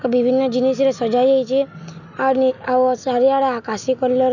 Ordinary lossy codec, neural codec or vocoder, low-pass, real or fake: none; none; 7.2 kHz; real